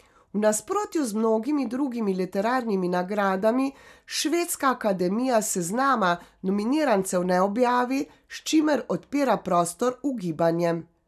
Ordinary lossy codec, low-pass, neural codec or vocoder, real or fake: none; 14.4 kHz; none; real